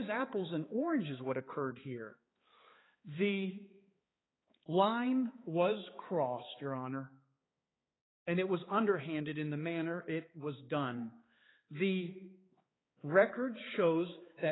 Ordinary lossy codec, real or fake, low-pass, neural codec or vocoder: AAC, 16 kbps; fake; 7.2 kHz; codec, 16 kHz, 4 kbps, X-Codec, HuBERT features, trained on balanced general audio